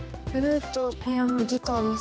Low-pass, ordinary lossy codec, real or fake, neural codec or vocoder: none; none; fake; codec, 16 kHz, 1 kbps, X-Codec, HuBERT features, trained on general audio